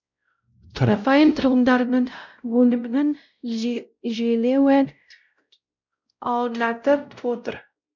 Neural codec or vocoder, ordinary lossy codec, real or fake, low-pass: codec, 16 kHz, 0.5 kbps, X-Codec, WavLM features, trained on Multilingual LibriSpeech; none; fake; 7.2 kHz